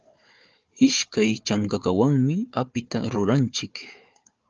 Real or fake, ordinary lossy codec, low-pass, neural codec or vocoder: fake; Opus, 24 kbps; 7.2 kHz; codec, 16 kHz, 4 kbps, FunCodec, trained on Chinese and English, 50 frames a second